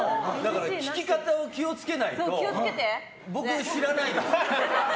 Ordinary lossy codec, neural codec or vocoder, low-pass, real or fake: none; none; none; real